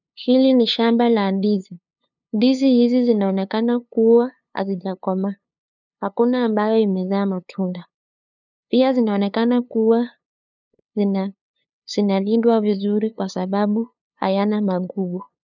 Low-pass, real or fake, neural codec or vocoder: 7.2 kHz; fake; codec, 16 kHz, 2 kbps, FunCodec, trained on LibriTTS, 25 frames a second